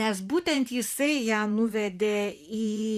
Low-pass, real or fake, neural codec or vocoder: 14.4 kHz; fake; vocoder, 44.1 kHz, 128 mel bands, Pupu-Vocoder